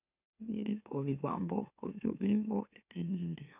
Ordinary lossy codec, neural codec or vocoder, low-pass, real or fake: none; autoencoder, 44.1 kHz, a latent of 192 numbers a frame, MeloTTS; 3.6 kHz; fake